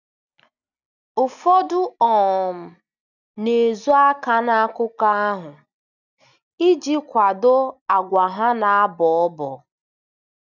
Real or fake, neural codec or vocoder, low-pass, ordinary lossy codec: real; none; 7.2 kHz; none